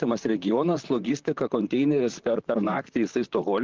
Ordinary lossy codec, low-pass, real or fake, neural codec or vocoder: Opus, 32 kbps; 7.2 kHz; fake; vocoder, 44.1 kHz, 128 mel bands, Pupu-Vocoder